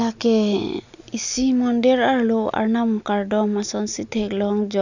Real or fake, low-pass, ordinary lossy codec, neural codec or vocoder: real; 7.2 kHz; none; none